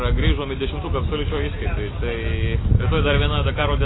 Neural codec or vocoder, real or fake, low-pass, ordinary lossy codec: none; real; 7.2 kHz; AAC, 16 kbps